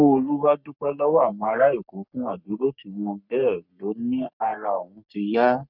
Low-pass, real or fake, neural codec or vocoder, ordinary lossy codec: 5.4 kHz; fake; codec, 44.1 kHz, 3.4 kbps, Pupu-Codec; none